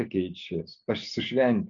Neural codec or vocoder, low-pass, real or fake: none; 7.2 kHz; real